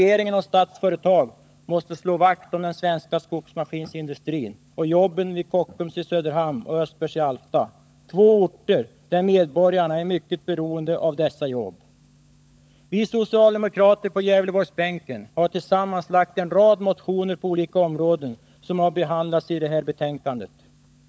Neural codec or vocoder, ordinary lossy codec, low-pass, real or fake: codec, 16 kHz, 16 kbps, FunCodec, trained on LibriTTS, 50 frames a second; none; none; fake